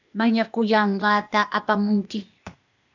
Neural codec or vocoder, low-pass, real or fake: codec, 16 kHz, 0.8 kbps, ZipCodec; 7.2 kHz; fake